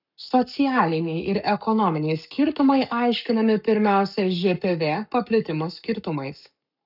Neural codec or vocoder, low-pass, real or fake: codec, 44.1 kHz, 7.8 kbps, Pupu-Codec; 5.4 kHz; fake